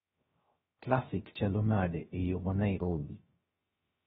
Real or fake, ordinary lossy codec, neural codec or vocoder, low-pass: fake; AAC, 16 kbps; codec, 16 kHz, 0.3 kbps, FocalCodec; 7.2 kHz